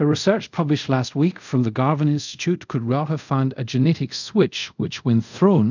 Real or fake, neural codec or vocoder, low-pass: fake; codec, 24 kHz, 0.5 kbps, DualCodec; 7.2 kHz